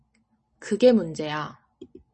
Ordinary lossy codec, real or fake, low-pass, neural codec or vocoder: MP3, 32 kbps; real; 10.8 kHz; none